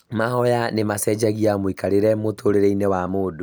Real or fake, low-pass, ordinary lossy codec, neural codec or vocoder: real; none; none; none